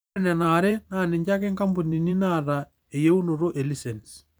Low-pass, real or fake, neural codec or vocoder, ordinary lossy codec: none; fake; vocoder, 44.1 kHz, 128 mel bands every 512 samples, BigVGAN v2; none